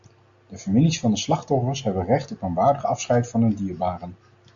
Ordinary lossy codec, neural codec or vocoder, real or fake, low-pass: MP3, 64 kbps; none; real; 7.2 kHz